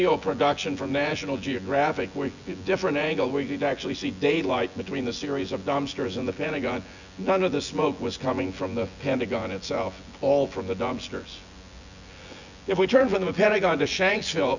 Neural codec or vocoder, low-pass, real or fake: vocoder, 24 kHz, 100 mel bands, Vocos; 7.2 kHz; fake